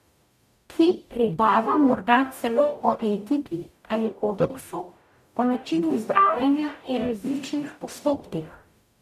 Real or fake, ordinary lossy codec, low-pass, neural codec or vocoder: fake; none; 14.4 kHz; codec, 44.1 kHz, 0.9 kbps, DAC